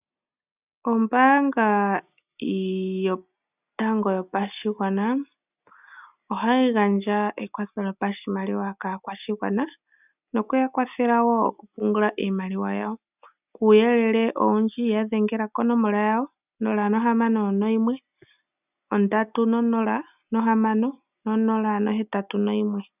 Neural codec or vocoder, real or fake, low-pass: none; real; 3.6 kHz